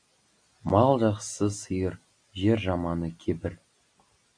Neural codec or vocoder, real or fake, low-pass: none; real; 9.9 kHz